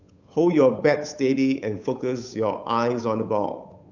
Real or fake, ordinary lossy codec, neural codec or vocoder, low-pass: fake; none; codec, 16 kHz, 8 kbps, FunCodec, trained on Chinese and English, 25 frames a second; 7.2 kHz